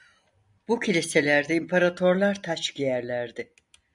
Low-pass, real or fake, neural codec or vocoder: 10.8 kHz; real; none